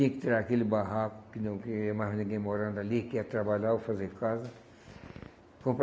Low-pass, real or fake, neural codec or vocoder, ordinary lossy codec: none; real; none; none